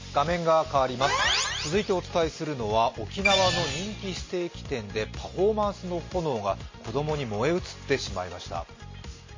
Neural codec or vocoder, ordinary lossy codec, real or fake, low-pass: none; MP3, 32 kbps; real; 7.2 kHz